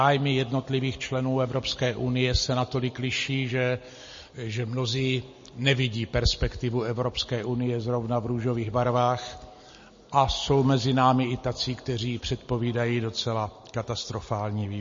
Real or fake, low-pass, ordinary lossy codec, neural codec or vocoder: real; 7.2 kHz; MP3, 32 kbps; none